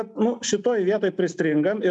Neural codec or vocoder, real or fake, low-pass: none; real; 10.8 kHz